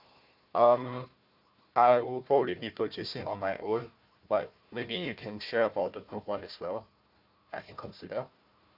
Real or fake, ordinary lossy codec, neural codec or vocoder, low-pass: fake; none; codec, 16 kHz, 1 kbps, FunCodec, trained on Chinese and English, 50 frames a second; 5.4 kHz